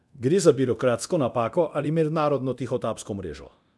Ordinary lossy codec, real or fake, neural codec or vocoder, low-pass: none; fake; codec, 24 kHz, 0.9 kbps, DualCodec; none